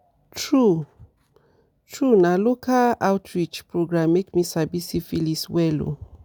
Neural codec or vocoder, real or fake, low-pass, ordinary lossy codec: none; real; none; none